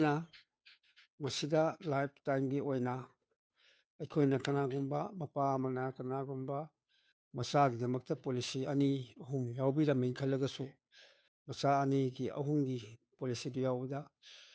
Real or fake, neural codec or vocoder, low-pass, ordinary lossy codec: fake; codec, 16 kHz, 2 kbps, FunCodec, trained on Chinese and English, 25 frames a second; none; none